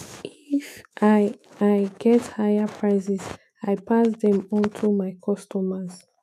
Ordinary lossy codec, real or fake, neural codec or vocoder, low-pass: none; fake; autoencoder, 48 kHz, 128 numbers a frame, DAC-VAE, trained on Japanese speech; 14.4 kHz